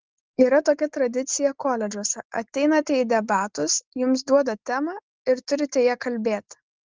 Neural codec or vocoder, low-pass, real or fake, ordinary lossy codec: none; 7.2 kHz; real; Opus, 16 kbps